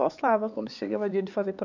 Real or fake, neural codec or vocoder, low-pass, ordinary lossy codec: fake; codec, 16 kHz, 4 kbps, X-Codec, HuBERT features, trained on LibriSpeech; 7.2 kHz; none